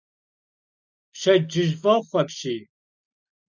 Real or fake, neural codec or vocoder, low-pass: real; none; 7.2 kHz